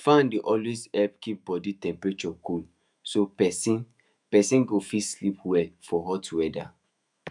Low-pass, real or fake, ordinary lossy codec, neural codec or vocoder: 10.8 kHz; fake; none; autoencoder, 48 kHz, 128 numbers a frame, DAC-VAE, trained on Japanese speech